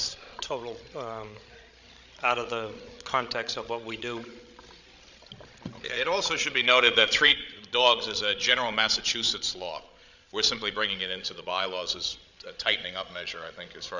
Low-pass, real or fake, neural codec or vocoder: 7.2 kHz; fake; codec, 16 kHz, 16 kbps, FreqCodec, larger model